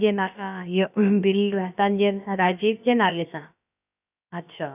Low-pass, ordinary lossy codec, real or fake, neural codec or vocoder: 3.6 kHz; none; fake; codec, 16 kHz, about 1 kbps, DyCAST, with the encoder's durations